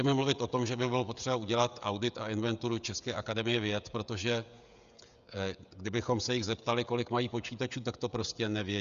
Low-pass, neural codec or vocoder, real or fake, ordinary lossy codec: 7.2 kHz; codec, 16 kHz, 16 kbps, FreqCodec, smaller model; fake; Opus, 64 kbps